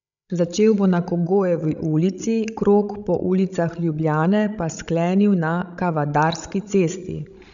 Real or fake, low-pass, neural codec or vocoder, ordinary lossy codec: fake; 7.2 kHz; codec, 16 kHz, 16 kbps, FreqCodec, larger model; MP3, 96 kbps